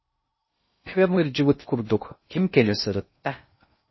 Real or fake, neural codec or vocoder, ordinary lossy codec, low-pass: fake; codec, 16 kHz in and 24 kHz out, 0.6 kbps, FocalCodec, streaming, 2048 codes; MP3, 24 kbps; 7.2 kHz